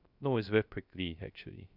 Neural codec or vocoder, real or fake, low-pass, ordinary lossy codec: codec, 16 kHz, 0.3 kbps, FocalCodec; fake; 5.4 kHz; none